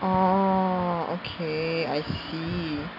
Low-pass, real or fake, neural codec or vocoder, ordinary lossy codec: 5.4 kHz; real; none; none